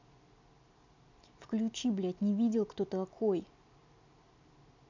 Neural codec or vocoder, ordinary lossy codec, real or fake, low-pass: none; none; real; 7.2 kHz